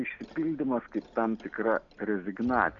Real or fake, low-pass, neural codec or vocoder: real; 7.2 kHz; none